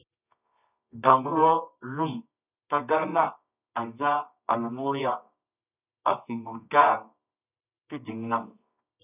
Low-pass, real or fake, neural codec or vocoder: 3.6 kHz; fake; codec, 24 kHz, 0.9 kbps, WavTokenizer, medium music audio release